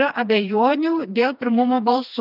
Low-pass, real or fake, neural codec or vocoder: 5.4 kHz; fake; codec, 16 kHz, 2 kbps, FreqCodec, smaller model